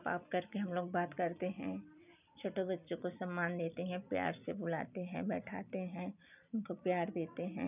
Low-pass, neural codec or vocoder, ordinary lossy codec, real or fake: 3.6 kHz; none; none; real